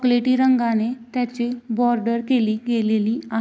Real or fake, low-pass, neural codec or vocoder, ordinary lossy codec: real; none; none; none